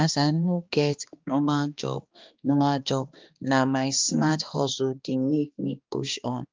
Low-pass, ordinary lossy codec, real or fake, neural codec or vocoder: 7.2 kHz; Opus, 32 kbps; fake; codec, 16 kHz, 2 kbps, X-Codec, HuBERT features, trained on balanced general audio